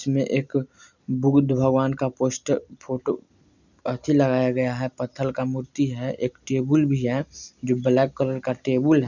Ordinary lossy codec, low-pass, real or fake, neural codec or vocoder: none; 7.2 kHz; real; none